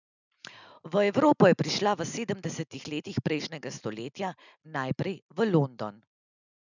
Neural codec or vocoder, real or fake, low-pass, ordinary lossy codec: none; real; 7.2 kHz; none